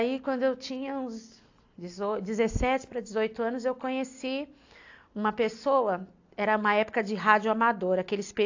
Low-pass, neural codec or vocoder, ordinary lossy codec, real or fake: 7.2 kHz; none; AAC, 48 kbps; real